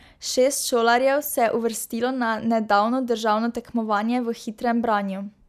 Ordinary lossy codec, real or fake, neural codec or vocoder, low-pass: none; real; none; 14.4 kHz